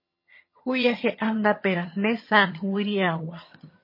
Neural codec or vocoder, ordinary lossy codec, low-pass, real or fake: vocoder, 22.05 kHz, 80 mel bands, HiFi-GAN; MP3, 24 kbps; 5.4 kHz; fake